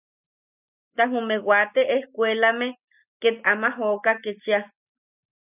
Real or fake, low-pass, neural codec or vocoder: real; 3.6 kHz; none